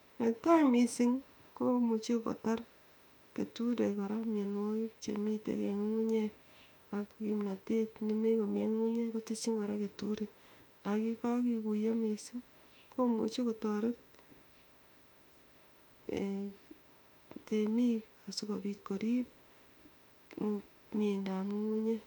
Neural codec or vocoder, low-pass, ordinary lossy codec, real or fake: autoencoder, 48 kHz, 32 numbers a frame, DAC-VAE, trained on Japanese speech; 19.8 kHz; none; fake